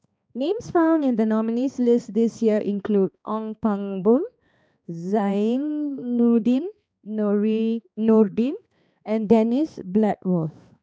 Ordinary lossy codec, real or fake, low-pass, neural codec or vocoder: none; fake; none; codec, 16 kHz, 2 kbps, X-Codec, HuBERT features, trained on balanced general audio